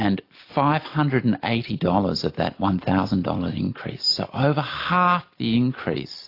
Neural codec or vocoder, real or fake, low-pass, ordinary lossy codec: none; real; 5.4 kHz; AAC, 32 kbps